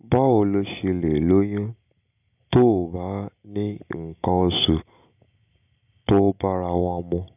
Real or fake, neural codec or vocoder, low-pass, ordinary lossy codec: real; none; 3.6 kHz; none